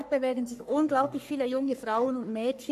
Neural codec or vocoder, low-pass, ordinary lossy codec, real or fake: codec, 44.1 kHz, 3.4 kbps, Pupu-Codec; 14.4 kHz; AAC, 96 kbps; fake